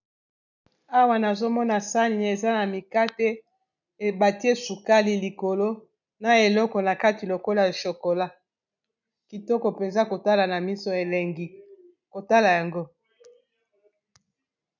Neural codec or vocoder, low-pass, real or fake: none; 7.2 kHz; real